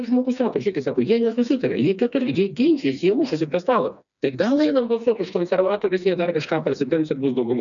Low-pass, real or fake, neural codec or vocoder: 7.2 kHz; fake; codec, 16 kHz, 2 kbps, FreqCodec, smaller model